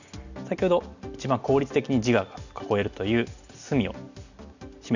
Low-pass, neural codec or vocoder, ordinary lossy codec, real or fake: 7.2 kHz; none; none; real